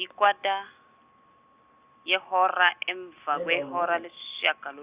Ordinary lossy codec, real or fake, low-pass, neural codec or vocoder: Opus, 64 kbps; real; 3.6 kHz; none